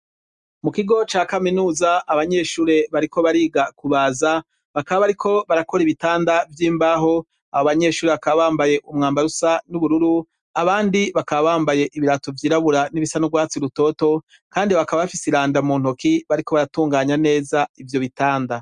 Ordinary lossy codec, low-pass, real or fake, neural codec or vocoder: Opus, 64 kbps; 10.8 kHz; real; none